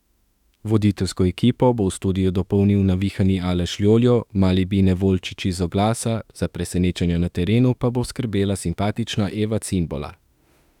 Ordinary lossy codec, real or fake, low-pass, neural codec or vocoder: none; fake; 19.8 kHz; autoencoder, 48 kHz, 32 numbers a frame, DAC-VAE, trained on Japanese speech